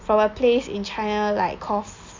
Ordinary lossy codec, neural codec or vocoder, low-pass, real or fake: MP3, 48 kbps; none; 7.2 kHz; real